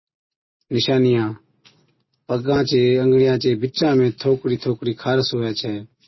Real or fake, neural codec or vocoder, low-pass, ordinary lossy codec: real; none; 7.2 kHz; MP3, 24 kbps